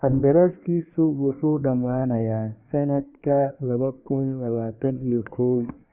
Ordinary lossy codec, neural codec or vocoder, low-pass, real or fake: none; codec, 24 kHz, 1 kbps, SNAC; 3.6 kHz; fake